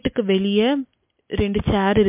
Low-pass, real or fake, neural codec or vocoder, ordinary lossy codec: 3.6 kHz; real; none; MP3, 32 kbps